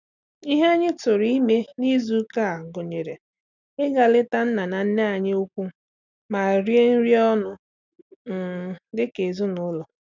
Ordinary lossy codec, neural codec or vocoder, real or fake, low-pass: none; none; real; 7.2 kHz